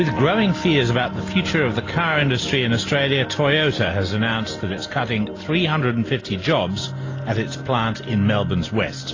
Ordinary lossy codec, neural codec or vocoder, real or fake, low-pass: AAC, 32 kbps; none; real; 7.2 kHz